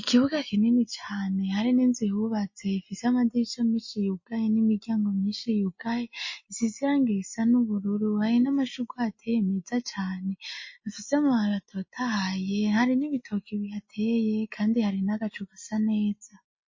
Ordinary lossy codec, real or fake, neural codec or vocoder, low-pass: MP3, 32 kbps; real; none; 7.2 kHz